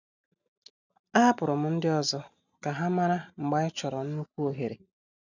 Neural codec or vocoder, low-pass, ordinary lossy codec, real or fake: none; 7.2 kHz; none; real